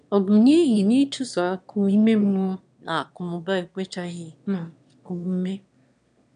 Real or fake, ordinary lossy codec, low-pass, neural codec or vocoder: fake; none; 9.9 kHz; autoencoder, 22.05 kHz, a latent of 192 numbers a frame, VITS, trained on one speaker